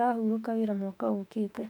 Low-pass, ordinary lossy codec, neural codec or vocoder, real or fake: 19.8 kHz; none; autoencoder, 48 kHz, 32 numbers a frame, DAC-VAE, trained on Japanese speech; fake